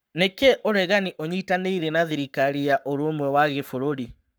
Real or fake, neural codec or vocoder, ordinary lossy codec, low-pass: fake; codec, 44.1 kHz, 7.8 kbps, Pupu-Codec; none; none